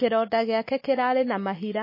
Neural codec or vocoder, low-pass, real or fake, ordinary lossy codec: none; 5.4 kHz; real; MP3, 24 kbps